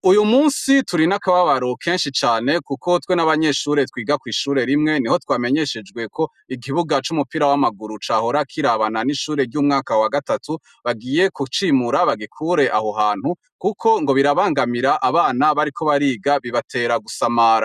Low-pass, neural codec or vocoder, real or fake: 14.4 kHz; none; real